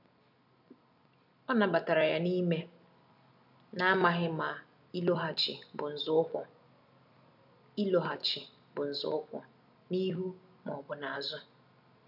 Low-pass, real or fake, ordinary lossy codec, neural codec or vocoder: 5.4 kHz; real; none; none